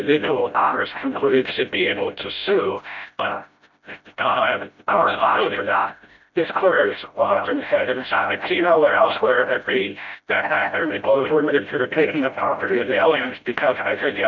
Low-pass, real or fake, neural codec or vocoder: 7.2 kHz; fake; codec, 16 kHz, 0.5 kbps, FreqCodec, smaller model